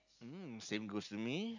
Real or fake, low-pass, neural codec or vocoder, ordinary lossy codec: real; 7.2 kHz; none; none